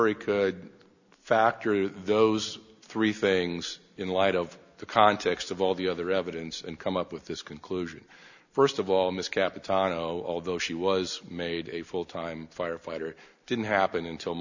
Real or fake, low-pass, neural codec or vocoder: real; 7.2 kHz; none